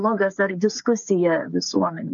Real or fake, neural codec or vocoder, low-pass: fake; codec, 16 kHz, 8 kbps, FreqCodec, smaller model; 7.2 kHz